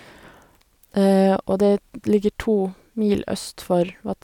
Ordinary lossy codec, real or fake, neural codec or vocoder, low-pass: none; real; none; 19.8 kHz